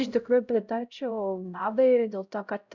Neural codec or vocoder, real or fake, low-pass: codec, 16 kHz, 0.5 kbps, X-Codec, HuBERT features, trained on LibriSpeech; fake; 7.2 kHz